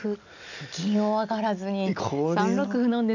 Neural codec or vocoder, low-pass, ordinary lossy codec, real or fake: none; 7.2 kHz; none; real